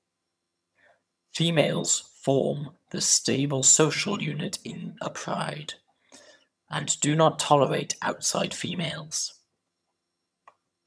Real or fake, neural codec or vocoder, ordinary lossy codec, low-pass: fake; vocoder, 22.05 kHz, 80 mel bands, HiFi-GAN; none; none